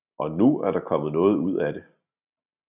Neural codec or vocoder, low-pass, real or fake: none; 3.6 kHz; real